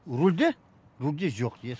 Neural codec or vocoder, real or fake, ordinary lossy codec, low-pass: none; real; none; none